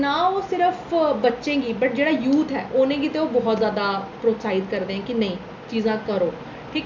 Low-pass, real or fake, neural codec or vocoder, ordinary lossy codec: none; real; none; none